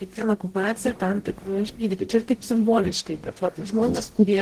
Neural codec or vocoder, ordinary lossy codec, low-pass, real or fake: codec, 44.1 kHz, 0.9 kbps, DAC; Opus, 16 kbps; 14.4 kHz; fake